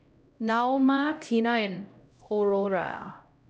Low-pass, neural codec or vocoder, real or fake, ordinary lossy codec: none; codec, 16 kHz, 0.5 kbps, X-Codec, HuBERT features, trained on LibriSpeech; fake; none